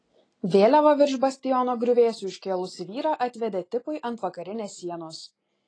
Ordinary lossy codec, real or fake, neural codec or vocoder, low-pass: AAC, 32 kbps; real; none; 9.9 kHz